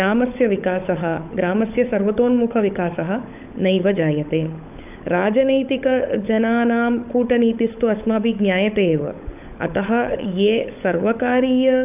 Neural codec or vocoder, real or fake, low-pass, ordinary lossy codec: codec, 16 kHz, 16 kbps, FunCodec, trained on LibriTTS, 50 frames a second; fake; 3.6 kHz; AAC, 32 kbps